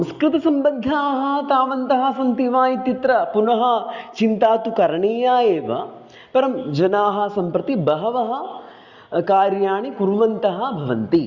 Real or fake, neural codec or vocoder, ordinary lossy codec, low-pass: real; none; Opus, 64 kbps; 7.2 kHz